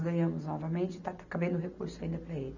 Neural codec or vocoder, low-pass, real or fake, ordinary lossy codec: none; 7.2 kHz; real; none